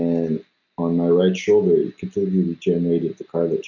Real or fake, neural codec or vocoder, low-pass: real; none; 7.2 kHz